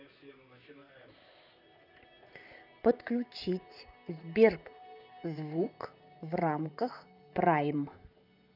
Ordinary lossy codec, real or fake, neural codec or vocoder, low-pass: none; fake; vocoder, 44.1 kHz, 128 mel bands, Pupu-Vocoder; 5.4 kHz